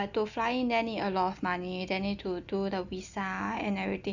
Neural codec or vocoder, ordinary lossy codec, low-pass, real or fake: none; none; 7.2 kHz; real